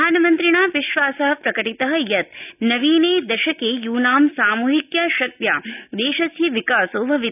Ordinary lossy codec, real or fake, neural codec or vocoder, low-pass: none; real; none; 3.6 kHz